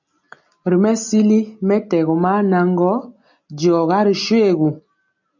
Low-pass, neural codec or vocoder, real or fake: 7.2 kHz; none; real